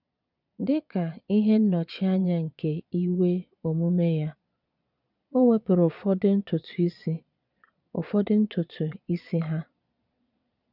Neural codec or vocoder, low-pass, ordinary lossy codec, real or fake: vocoder, 22.05 kHz, 80 mel bands, Vocos; 5.4 kHz; none; fake